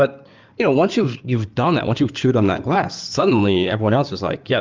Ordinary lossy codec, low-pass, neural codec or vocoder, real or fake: Opus, 32 kbps; 7.2 kHz; codec, 16 kHz in and 24 kHz out, 2.2 kbps, FireRedTTS-2 codec; fake